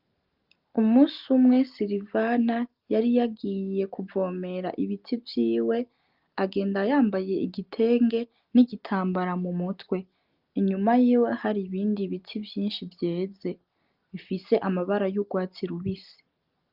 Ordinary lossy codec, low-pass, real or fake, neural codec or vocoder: Opus, 32 kbps; 5.4 kHz; real; none